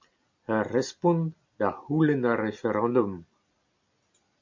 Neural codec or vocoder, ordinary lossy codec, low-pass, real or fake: none; MP3, 48 kbps; 7.2 kHz; real